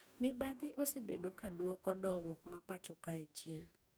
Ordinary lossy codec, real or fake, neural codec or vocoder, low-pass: none; fake; codec, 44.1 kHz, 2.6 kbps, DAC; none